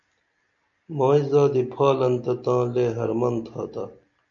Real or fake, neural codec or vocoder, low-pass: real; none; 7.2 kHz